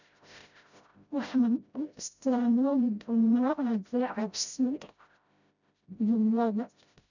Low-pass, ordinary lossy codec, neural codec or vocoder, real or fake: 7.2 kHz; none; codec, 16 kHz, 0.5 kbps, FreqCodec, smaller model; fake